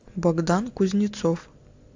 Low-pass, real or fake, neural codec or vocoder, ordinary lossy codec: 7.2 kHz; real; none; AAC, 48 kbps